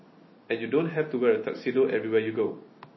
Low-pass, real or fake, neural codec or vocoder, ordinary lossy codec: 7.2 kHz; real; none; MP3, 24 kbps